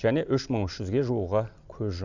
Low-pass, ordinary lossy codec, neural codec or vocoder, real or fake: 7.2 kHz; none; none; real